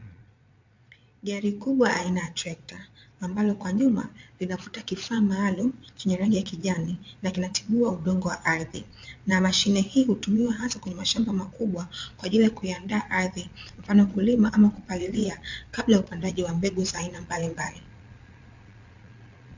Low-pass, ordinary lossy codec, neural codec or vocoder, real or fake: 7.2 kHz; MP3, 64 kbps; vocoder, 22.05 kHz, 80 mel bands, Vocos; fake